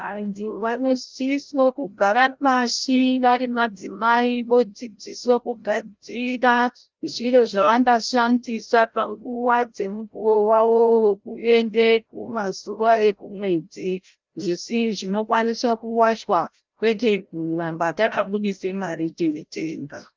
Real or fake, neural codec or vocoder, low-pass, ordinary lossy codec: fake; codec, 16 kHz, 0.5 kbps, FreqCodec, larger model; 7.2 kHz; Opus, 24 kbps